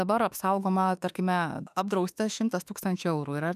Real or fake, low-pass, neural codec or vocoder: fake; 14.4 kHz; autoencoder, 48 kHz, 32 numbers a frame, DAC-VAE, trained on Japanese speech